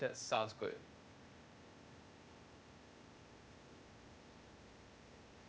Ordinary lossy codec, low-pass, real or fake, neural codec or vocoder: none; none; fake; codec, 16 kHz, 0.8 kbps, ZipCodec